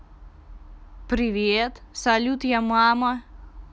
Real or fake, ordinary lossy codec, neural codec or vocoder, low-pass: real; none; none; none